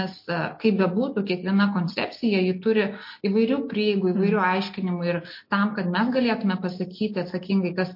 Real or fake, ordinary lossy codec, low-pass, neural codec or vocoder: real; MP3, 32 kbps; 5.4 kHz; none